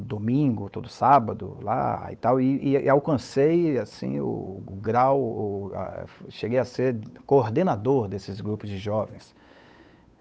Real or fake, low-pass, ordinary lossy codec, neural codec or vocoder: fake; none; none; codec, 16 kHz, 8 kbps, FunCodec, trained on Chinese and English, 25 frames a second